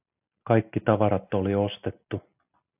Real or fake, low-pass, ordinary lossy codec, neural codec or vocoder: real; 3.6 kHz; AAC, 32 kbps; none